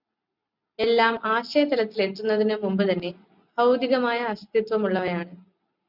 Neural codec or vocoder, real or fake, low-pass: none; real; 5.4 kHz